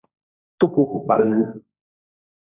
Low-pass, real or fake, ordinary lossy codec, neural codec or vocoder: 3.6 kHz; fake; Opus, 64 kbps; codec, 16 kHz, 1.1 kbps, Voila-Tokenizer